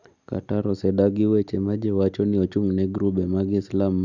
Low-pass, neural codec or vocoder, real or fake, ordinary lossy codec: 7.2 kHz; none; real; none